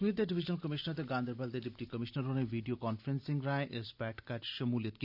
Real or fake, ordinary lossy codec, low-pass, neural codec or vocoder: real; none; 5.4 kHz; none